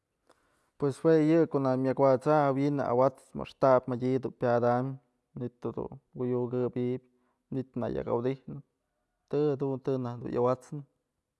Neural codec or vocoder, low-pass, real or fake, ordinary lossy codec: none; none; real; none